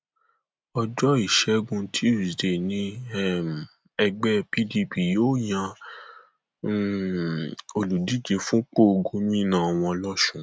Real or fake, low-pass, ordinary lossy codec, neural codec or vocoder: real; none; none; none